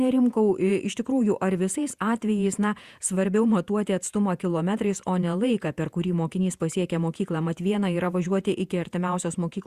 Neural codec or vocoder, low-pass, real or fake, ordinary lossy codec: vocoder, 48 kHz, 128 mel bands, Vocos; 14.4 kHz; fake; Opus, 64 kbps